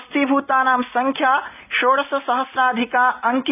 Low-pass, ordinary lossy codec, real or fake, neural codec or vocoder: 3.6 kHz; none; real; none